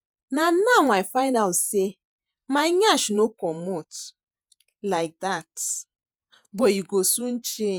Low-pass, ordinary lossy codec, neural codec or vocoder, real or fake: none; none; vocoder, 48 kHz, 128 mel bands, Vocos; fake